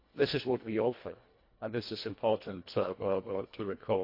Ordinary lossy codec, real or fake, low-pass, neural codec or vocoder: MP3, 32 kbps; fake; 5.4 kHz; codec, 24 kHz, 1.5 kbps, HILCodec